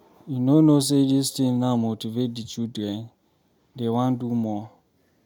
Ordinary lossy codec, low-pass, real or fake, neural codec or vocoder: none; 19.8 kHz; real; none